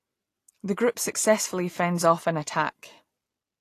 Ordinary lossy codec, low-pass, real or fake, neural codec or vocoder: AAC, 48 kbps; 14.4 kHz; real; none